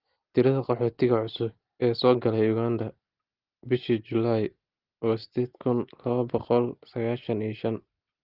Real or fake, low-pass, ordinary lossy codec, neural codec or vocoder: real; 5.4 kHz; Opus, 16 kbps; none